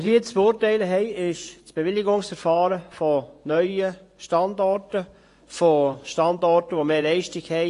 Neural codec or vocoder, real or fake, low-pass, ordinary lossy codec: vocoder, 24 kHz, 100 mel bands, Vocos; fake; 10.8 kHz; AAC, 48 kbps